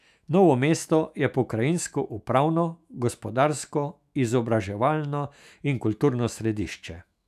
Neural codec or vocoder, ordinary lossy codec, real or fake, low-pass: autoencoder, 48 kHz, 128 numbers a frame, DAC-VAE, trained on Japanese speech; none; fake; 14.4 kHz